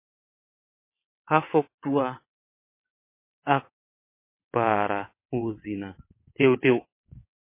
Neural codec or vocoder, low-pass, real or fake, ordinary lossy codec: vocoder, 22.05 kHz, 80 mel bands, WaveNeXt; 3.6 kHz; fake; MP3, 24 kbps